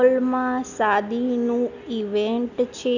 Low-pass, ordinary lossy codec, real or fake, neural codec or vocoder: 7.2 kHz; none; real; none